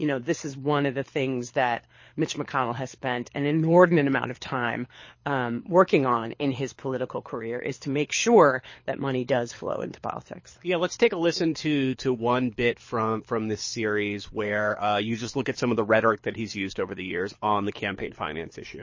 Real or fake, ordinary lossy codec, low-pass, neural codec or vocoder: fake; MP3, 32 kbps; 7.2 kHz; codec, 24 kHz, 6 kbps, HILCodec